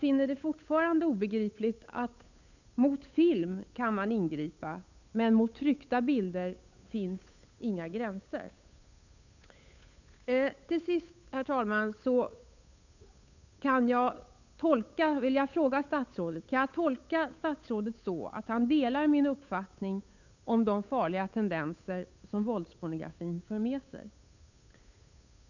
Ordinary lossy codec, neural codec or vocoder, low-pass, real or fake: none; codec, 16 kHz, 8 kbps, FunCodec, trained on Chinese and English, 25 frames a second; 7.2 kHz; fake